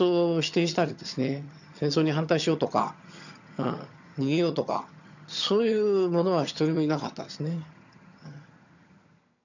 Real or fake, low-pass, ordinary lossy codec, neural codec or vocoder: fake; 7.2 kHz; none; vocoder, 22.05 kHz, 80 mel bands, HiFi-GAN